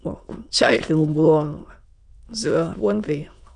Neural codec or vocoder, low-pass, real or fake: autoencoder, 22.05 kHz, a latent of 192 numbers a frame, VITS, trained on many speakers; 9.9 kHz; fake